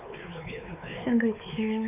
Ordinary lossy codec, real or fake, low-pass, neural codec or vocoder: none; fake; 3.6 kHz; codec, 16 kHz, 4 kbps, X-Codec, WavLM features, trained on Multilingual LibriSpeech